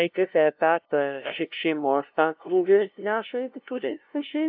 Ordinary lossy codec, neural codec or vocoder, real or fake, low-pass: AAC, 48 kbps; codec, 16 kHz, 0.5 kbps, FunCodec, trained on LibriTTS, 25 frames a second; fake; 5.4 kHz